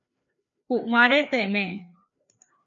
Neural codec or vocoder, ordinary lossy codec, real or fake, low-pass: codec, 16 kHz, 2 kbps, FreqCodec, larger model; MP3, 64 kbps; fake; 7.2 kHz